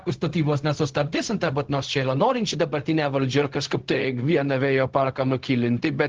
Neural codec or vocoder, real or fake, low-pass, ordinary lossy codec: codec, 16 kHz, 0.4 kbps, LongCat-Audio-Codec; fake; 7.2 kHz; Opus, 16 kbps